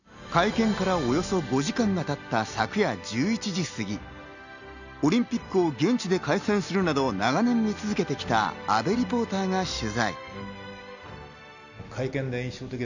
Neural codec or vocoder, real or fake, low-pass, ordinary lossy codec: none; real; 7.2 kHz; none